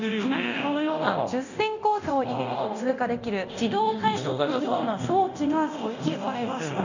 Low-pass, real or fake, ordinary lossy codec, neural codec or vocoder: 7.2 kHz; fake; none; codec, 24 kHz, 0.9 kbps, DualCodec